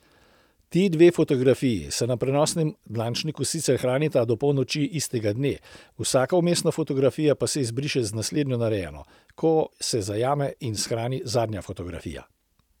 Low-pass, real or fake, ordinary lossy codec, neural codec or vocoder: 19.8 kHz; real; none; none